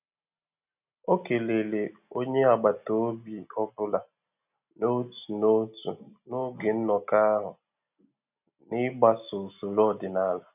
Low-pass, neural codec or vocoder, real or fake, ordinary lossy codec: 3.6 kHz; none; real; none